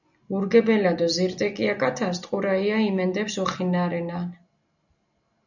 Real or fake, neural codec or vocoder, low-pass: real; none; 7.2 kHz